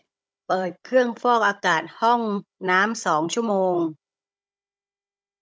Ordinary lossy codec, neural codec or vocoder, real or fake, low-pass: none; codec, 16 kHz, 16 kbps, FunCodec, trained on Chinese and English, 50 frames a second; fake; none